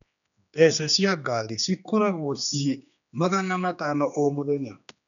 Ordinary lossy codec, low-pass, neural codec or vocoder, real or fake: none; 7.2 kHz; codec, 16 kHz, 2 kbps, X-Codec, HuBERT features, trained on general audio; fake